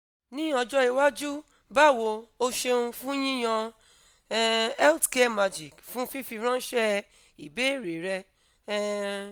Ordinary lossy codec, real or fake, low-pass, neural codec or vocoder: none; real; none; none